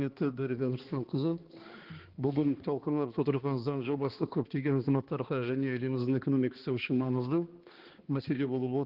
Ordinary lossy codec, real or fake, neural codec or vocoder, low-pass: Opus, 16 kbps; fake; codec, 16 kHz, 2 kbps, X-Codec, HuBERT features, trained on balanced general audio; 5.4 kHz